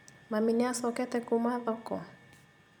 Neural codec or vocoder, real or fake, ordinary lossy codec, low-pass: vocoder, 44.1 kHz, 128 mel bands every 256 samples, BigVGAN v2; fake; none; 19.8 kHz